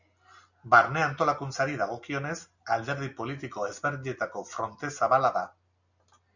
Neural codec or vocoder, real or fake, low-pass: none; real; 7.2 kHz